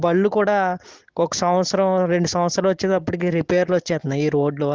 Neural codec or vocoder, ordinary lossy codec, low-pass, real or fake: none; Opus, 16 kbps; 7.2 kHz; real